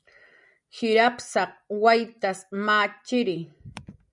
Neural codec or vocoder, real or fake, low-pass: none; real; 10.8 kHz